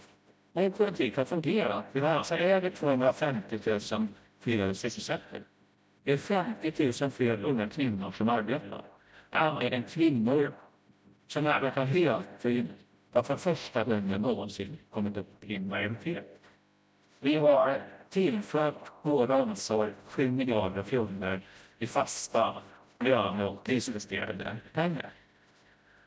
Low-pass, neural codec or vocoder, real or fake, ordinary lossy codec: none; codec, 16 kHz, 0.5 kbps, FreqCodec, smaller model; fake; none